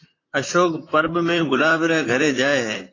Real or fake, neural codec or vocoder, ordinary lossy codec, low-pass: fake; vocoder, 44.1 kHz, 128 mel bands, Pupu-Vocoder; AAC, 32 kbps; 7.2 kHz